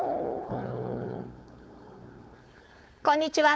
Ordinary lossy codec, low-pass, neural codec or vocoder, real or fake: none; none; codec, 16 kHz, 4.8 kbps, FACodec; fake